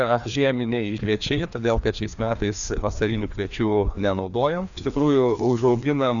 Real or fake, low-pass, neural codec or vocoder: fake; 7.2 kHz; codec, 16 kHz, 2 kbps, FreqCodec, larger model